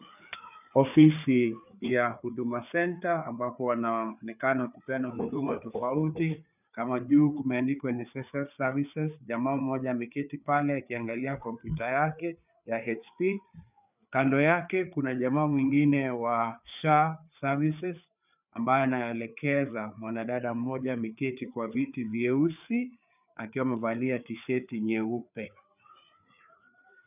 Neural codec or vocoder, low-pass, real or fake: codec, 16 kHz, 4 kbps, FreqCodec, larger model; 3.6 kHz; fake